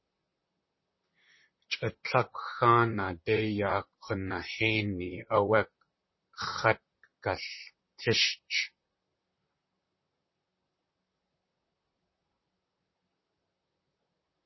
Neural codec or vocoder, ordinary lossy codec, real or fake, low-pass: vocoder, 44.1 kHz, 128 mel bands, Pupu-Vocoder; MP3, 24 kbps; fake; 7.2 kHz